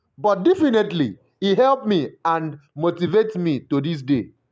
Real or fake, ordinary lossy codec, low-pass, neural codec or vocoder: real; none; none; none